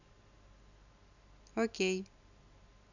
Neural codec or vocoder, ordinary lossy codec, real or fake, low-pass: none; none; real; 7.2 kHz